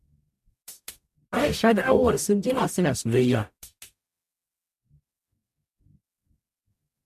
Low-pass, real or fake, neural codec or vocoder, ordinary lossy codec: 14.4 kHz; fake; codec, 44.1 kHz, 0.9 kbps, DAC; MP3, 64 kbps